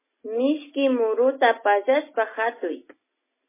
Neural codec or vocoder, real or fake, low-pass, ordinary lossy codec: none; real; 3.6 kHz; MP3, 16 kbps